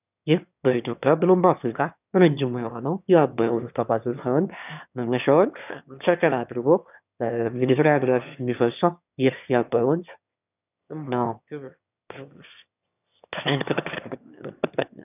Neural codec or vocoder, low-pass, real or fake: autoencoder, 22.05 kHz, a latent of 192 numbers a frame, VITS, trained on one speaker; 3.6 kHz; fake